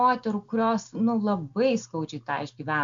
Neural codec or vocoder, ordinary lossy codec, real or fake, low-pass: none; MP3, 64 kbps; real; 7.2 kHz